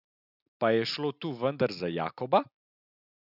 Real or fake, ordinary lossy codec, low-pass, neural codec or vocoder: real; none; 5.4 kHz; none